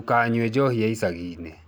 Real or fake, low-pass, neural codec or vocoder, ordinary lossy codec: real; none; none; none